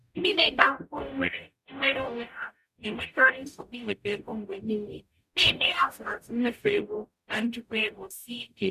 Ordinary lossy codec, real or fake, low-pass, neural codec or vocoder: none; fake; 14.4 kHz; codec, 44.1 kHz, 0.9 kbps, DAC